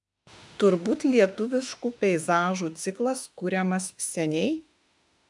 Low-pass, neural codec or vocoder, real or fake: 10.8 kHz; autoencoder, 48 kHz, 32 numbers a frame, DAC-VAE, trained on Japanese speech; fake